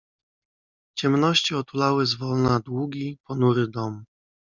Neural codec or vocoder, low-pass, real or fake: none; 7.2 kHz; real